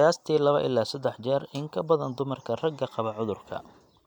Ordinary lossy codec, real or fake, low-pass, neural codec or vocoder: none; real; 19.8 kHz; none